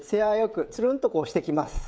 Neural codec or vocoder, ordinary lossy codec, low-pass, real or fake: codec, 16 kHz, 16 kbps, FreqCodec, smaller model; none; none; fake